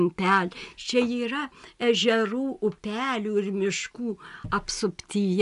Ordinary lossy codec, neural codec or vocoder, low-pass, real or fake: AAC, 96 kbps; none; 10.8 kHz; real